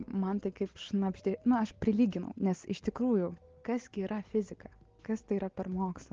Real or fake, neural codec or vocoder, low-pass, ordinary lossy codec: real; none; 7.2 kHz; Opus, 16 kbps